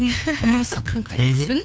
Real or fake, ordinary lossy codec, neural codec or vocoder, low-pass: fake; none; codec, 16 kHz, 2 kbps, FunCodec, trained on LibriTTS, 25 frames a second; none